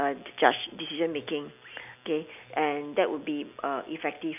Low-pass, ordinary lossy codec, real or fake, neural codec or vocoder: 3.6 kHz; none; real; none